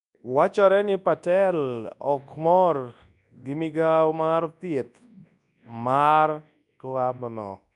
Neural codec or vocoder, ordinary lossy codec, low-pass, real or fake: codec, 24 kHz, 0.9 kbps, WavTokenizer, large speech release; none; 10.8 kHz; fake